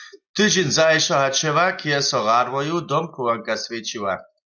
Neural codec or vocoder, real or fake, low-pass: none; real; 7.2 kHz